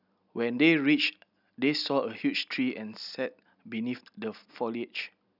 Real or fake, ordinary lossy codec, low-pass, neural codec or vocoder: real; none; 5.4 kHz; none